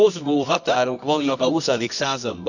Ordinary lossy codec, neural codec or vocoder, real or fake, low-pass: none; codec, 24 kHz, 0.9 kbps, WavTokenizer, medium music audio release; fake; 7.2 kHz